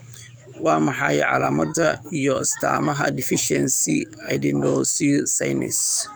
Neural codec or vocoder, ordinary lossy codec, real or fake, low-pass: codec, 44.1 kHz, 7.8 kbps, DAC; none; fake; none